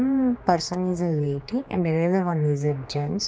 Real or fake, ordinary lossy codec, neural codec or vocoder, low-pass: fake; none; codec, 16 kHz, 2 kbps, X-Codec, HuBERT features, trained on general audio; none